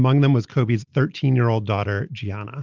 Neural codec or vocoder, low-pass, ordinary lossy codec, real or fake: none; 7.2 kHz; Opus, 32 kbps; real